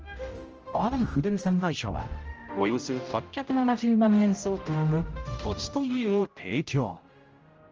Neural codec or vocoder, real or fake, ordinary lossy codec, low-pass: codec, 16 kHz, 0.5 kbps, X-Codec, HuBERT features, trained on general audio; fake; Opus, 24 kbps; 7.2 kHz